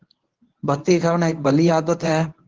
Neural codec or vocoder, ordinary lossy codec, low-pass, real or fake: codec, 24 kHz, 0.9 kbps, WavTokenizer, medium speech release version 1; Opus, 16 kbps; 7.2 kHz; fake